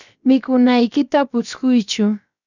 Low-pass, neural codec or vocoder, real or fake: 7.2 kHz; codec, 16 kHz, 0.7 kbps, FocalCodec; fake